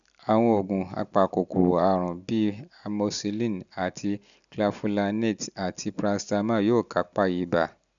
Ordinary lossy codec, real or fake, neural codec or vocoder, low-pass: none; real; none; 7.2 kHz